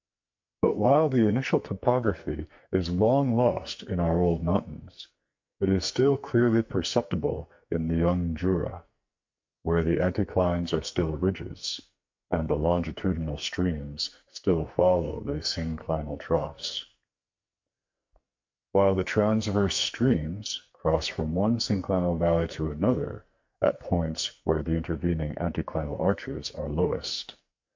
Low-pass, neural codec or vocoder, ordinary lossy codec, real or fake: 7.2 kHz; codec, 44.1 kHz, 2.6 kbps, SNAC; MP3, 48 kbps; fake